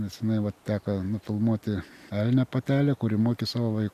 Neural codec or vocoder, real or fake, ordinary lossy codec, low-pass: none; real; AAC, 96 kbps; 14.4 kHz